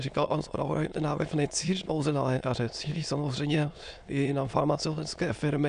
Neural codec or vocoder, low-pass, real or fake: autoencoder, 22.05 kHz, a latent of 192 numbers a frame, VITS, trained on many speakers; 9.9 kHz; fake